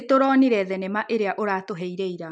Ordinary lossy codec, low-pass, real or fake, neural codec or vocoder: MP3, 96 kbps; 9.9 kHz; real; none